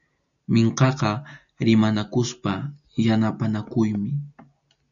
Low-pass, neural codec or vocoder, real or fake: 7.2 kHz; none; real